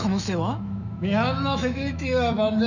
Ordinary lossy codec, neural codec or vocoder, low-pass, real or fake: none; autoencoder, 48 kHz, 128 numbers a frame, DAC-VAE, trained on Japanese speech; 7.2 kHz; fake